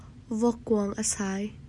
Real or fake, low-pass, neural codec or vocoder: real; 10.8 kHz; none